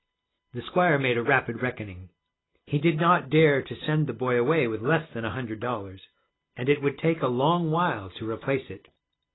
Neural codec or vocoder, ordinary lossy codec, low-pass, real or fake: none; AAC, 16 kbps; 7.2 kHz; real